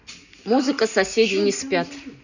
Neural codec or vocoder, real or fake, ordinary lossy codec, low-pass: none; real; none; 7.2 kHz